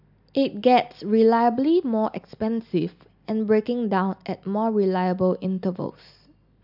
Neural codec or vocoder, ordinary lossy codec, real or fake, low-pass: none; AAC, 48 kbps; real; 5.4 kHz